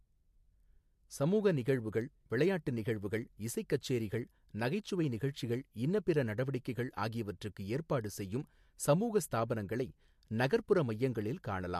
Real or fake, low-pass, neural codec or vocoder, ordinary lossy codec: real; 14.4 kHz; none; MP3, 64 kbps